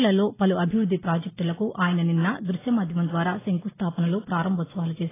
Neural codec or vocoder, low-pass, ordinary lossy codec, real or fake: none; 3.6 kHz; AAC, 16 kbps; real